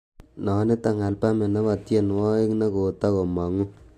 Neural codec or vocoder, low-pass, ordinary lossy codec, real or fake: none; 14.4 kHz; AAC, 64 kbps; real